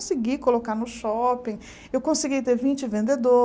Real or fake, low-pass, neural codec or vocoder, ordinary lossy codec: real; none; none; none